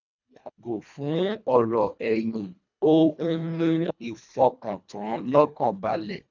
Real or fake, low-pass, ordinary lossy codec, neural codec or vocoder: fake; 7.2 kHz; none; codec, 24 kHz, 1.5 kbps, HILCodec